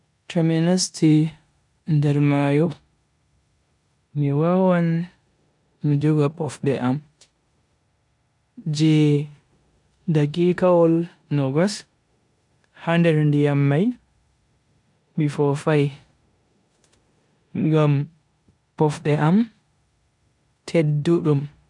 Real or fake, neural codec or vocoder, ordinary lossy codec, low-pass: fake; codec, 16 kHz in and 24 kHz out, 0.9 kbps, LongCat-Audio-Codec, four codebook decoder; none; 10.8 kHz